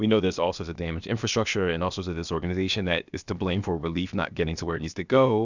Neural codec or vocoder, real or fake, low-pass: codec, 16 kHz, about 1 kbps, DyCAST, with the encoder's durations; fake; 7.2 kHz